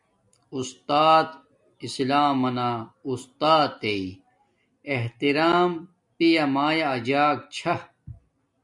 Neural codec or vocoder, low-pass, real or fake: none; 10.8 kHz; real